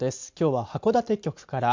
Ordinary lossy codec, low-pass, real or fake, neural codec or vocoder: MP3, 64 kbps; 7.2 kHz; real; none